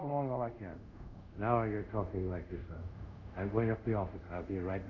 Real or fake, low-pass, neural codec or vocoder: fake; 7.2 kHz; codec, 24 kHz, 0.5 kbps, DualCodec